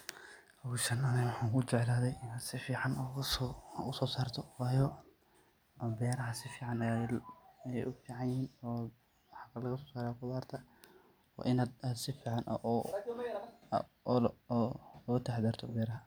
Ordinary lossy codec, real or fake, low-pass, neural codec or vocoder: none; real; none; none